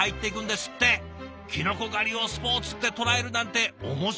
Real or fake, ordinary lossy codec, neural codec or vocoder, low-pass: real; none; none; none